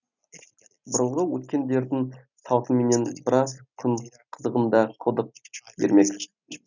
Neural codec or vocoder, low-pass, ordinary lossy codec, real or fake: none; 7.2 kHz; none; real